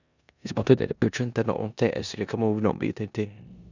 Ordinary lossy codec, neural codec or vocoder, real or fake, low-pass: none; codec, 16 kHz in and 24 kHz out, 0.9 kbps, LongCat-Audio-Codec, four codebook decoder; fake; 7.2 kHz